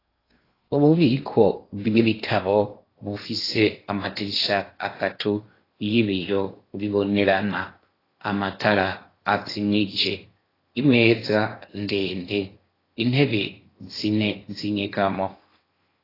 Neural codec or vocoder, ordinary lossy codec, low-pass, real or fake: codec, 16 kHz in and 24 kHz out, 0.8 kbps, FocalCodec, streaming, 65536 codes; AAC, 24 kbps; 5.4 kHz; fake